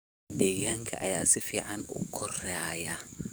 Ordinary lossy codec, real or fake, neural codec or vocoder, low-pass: none; fake; codec, 44.1 kHz, 7.8 kbps, DAC; none